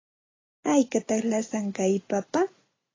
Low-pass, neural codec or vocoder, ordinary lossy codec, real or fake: 7.2 kHz; none; AAC, 32 kbps; real